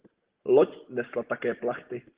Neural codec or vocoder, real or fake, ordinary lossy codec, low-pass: none; real; Opus, 16 kbps; 3.6 kHz